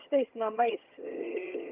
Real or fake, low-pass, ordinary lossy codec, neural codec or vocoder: fake; 3.6 kHz; Opus, 24 kbps; vocoder, 22.05 kHz, 80 mel bands, HiFi-GAN